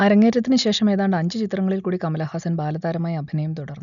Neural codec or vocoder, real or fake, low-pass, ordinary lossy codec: none; real; 7.2 kHz; none